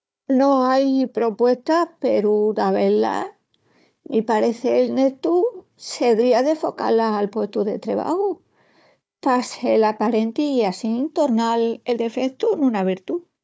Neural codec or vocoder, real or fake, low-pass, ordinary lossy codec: codec, 16 kHz, 4 kbps, FunCodec, trained on Chinese and English, 50 frames a second; fake; none; none